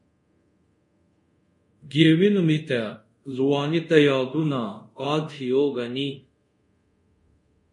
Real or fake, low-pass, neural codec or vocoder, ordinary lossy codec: fake; 10.8 kHz; codec, 24 kHz, 0.5 kbps, DualCodec; MP3, 48 kbps